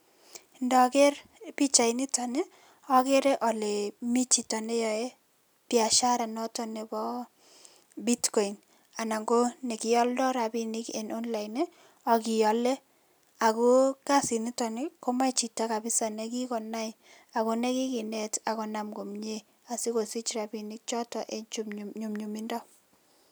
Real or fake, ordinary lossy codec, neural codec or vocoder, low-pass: real; none; none; none